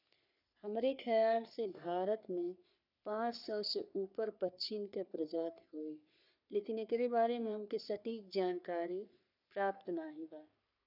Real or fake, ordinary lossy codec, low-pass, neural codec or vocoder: fake; none; 5.4 kHz; codec, 44.1 kHz, 3.4 kbps, Pupu-Codec